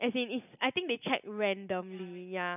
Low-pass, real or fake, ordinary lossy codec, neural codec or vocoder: 3.6 kHz; real; none; none